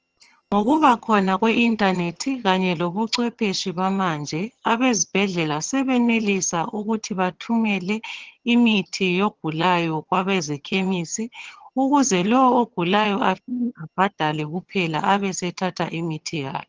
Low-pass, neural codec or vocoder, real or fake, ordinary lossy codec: 7.2 kHz; vocoder, 22.05 kHz, 80 mel bands, HiFi-GAN; fake; Opus, 16 kbps